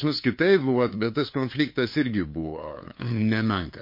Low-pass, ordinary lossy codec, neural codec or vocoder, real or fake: 5.4 kHz; MP3, 32 kbps; codec, 16 kHz, 2 kbps, FunCodec, trained on LibriTTS, 25 frames a second; fake